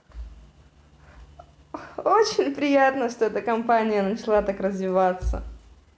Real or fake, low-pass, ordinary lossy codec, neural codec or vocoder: real; none; none; none